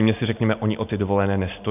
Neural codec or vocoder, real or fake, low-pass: none; real; 3.6 kHz